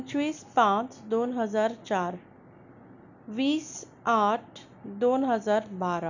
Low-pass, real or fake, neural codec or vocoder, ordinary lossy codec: 7.2 kHz; real; none; none